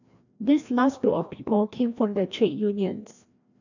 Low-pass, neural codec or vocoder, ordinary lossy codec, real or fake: 7.2 kHz; codec, 16 kHz, 1 kbps, FreqCodec, larger model; none; fake